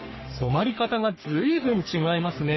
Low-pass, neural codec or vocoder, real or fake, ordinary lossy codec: 7.2 kHz; codec, 44.1 kHz, 3.4 kbps, Pupu-Codec; fake; MP3, 24 kbps